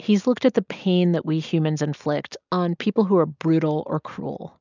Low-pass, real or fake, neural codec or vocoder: 7.2 kHz; real; none